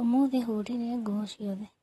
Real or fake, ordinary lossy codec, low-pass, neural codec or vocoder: fake; AAC, 32 kbps; 19.8 kHz; vocoder, 44.1 kHz, 128 mel bands, Pupu-Vocoder